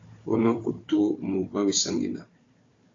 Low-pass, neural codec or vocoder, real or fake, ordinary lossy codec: 7.2 kHz; codec, 16 kHz, 4 kbps, FunCodec, trained on Chinese and English, 50 frames a second; fake; AAC, 32 kbps